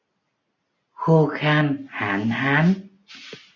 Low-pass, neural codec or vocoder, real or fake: 7.2 kHz; none; real